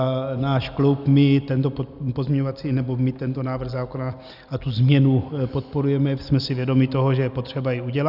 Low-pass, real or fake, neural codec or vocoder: 5.4 kHz; real; none